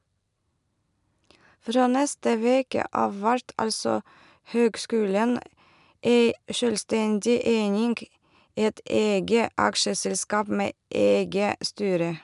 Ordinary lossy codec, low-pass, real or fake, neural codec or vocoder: none; 10.8 kHz; real; none